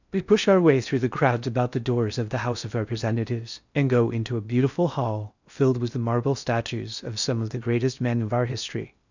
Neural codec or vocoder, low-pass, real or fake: codec, 16 kHz in and 24 kHz out, 0.6 kbps, FocalCodec, streaming, 4096 codes; 7.2 kHz; fake